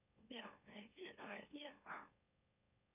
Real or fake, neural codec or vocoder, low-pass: fake; autoencoder, 44.1 kHz, a latent of 192 numbers a frame, MeloTTS; 3.6 kHz